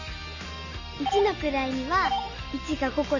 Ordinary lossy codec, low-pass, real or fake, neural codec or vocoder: none; 7.2 kHz; real; none